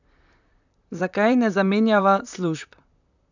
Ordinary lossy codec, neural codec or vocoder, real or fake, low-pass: none; vocoder, 44.1 kHz, 128 mel bands, Pupu-Vocoder; fake; 7.2 kHz